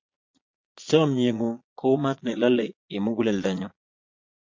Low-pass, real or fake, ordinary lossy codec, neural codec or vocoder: 7.2 kHz; fake; MP3, 48 kbps; vocoder, 22.05 kHz, 80 mel bands, Vocos